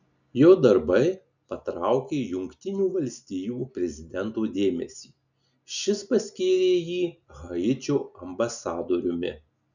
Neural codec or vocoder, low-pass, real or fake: none; 7.2 kHz; real